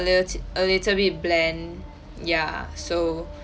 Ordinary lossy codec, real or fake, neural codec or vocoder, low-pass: none; real; none; none